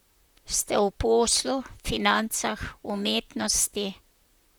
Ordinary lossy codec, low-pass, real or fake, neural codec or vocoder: none; none; fake; vocoder, 44.1 kHz, 128 mel bands, Pupu-Vocoder